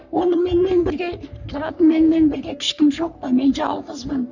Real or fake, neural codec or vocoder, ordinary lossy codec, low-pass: fake; codec, 44.1 kHz, 3.4 kbps, Pupu-Codec; none; 7.2 kHz